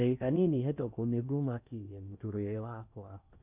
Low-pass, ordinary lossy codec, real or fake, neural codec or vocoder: 3.6 kHz; none; fake; codec, 16 kHz in and 24 kHz out, 0.6 kbps, FocalCodec, streaming, 4096 codes